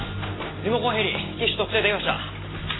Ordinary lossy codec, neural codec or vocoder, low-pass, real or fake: AAC, 16 kbps; none; 7.2 kHz; real